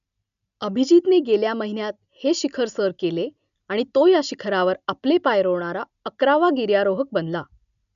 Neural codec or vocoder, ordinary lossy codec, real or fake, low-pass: none; none; real; 7.2 kHz